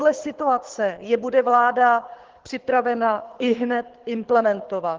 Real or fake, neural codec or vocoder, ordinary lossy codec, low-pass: fake; codec, 24 kHz, 6 kbps, HILCodec; Opus, 16 kbps; 7.2 kHz